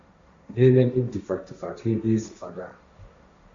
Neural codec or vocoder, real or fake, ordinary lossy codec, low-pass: codec, 16 kHz, 1.1 kbps, Voila-Tokenizer; fake; Opus, 64 kbps; 7.2 kHz